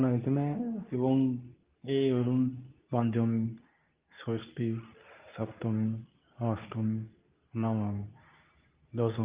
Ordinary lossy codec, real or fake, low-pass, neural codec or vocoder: Opus, 32 kbps; fake; 3.6 kHz; codec, 24 kHz, 0.9 kbps, WavTokenizer, medium speech release version 2